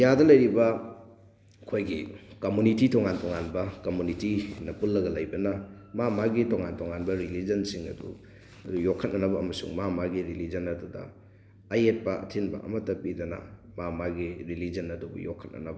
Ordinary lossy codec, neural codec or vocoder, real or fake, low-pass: none; none; real; none